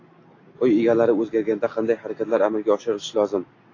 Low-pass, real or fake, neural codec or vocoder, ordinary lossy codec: 7.2 kHz; real; none; AAC, 32 kbps